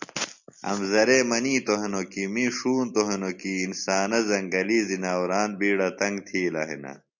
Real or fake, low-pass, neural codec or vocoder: real; 7.2 kHz; none